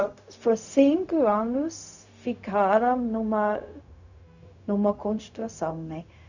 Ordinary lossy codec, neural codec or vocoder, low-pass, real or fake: none; codec, 16 kHz, 0.4 kbps, LongCat-Audio-Codec; 7.2 kHz; fake